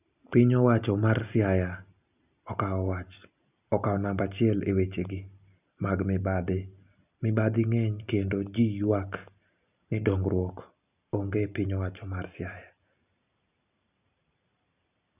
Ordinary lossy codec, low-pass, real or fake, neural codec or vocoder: none; 3.6 kHz; real; none